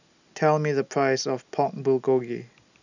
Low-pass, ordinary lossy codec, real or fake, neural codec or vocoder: 7.2 kHz; none; real; none